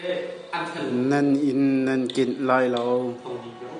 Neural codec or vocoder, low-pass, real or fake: none; 9.9 kHz; real